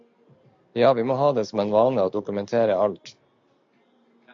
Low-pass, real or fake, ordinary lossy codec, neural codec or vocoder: 7.2 kHz; real; AAC, 64 kbps; none